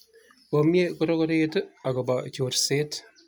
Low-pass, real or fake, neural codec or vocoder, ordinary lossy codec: none; real; none; none